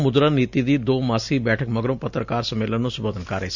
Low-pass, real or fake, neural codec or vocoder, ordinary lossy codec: 7.2 kHz; real; none; none